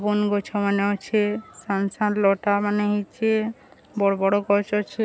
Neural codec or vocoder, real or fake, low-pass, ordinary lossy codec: none; real; none; none